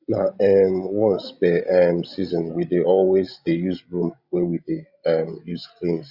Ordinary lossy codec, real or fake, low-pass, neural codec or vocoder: Opus, 64 kbps; fake; 5.4 kHz; codec, 16 kHz, 16 kbps, FreqCodec, larger model